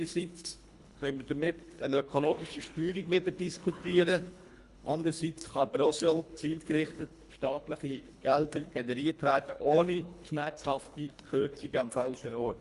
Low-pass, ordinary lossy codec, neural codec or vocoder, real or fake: 10.8 kHz; Opus, 64 kbps; codec, 24 kHz, 1.5 kbps, HILCodec; fake